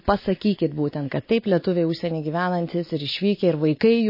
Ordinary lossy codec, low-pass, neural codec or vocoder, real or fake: MP3, 24 kbps; 5.4 kHz; none; real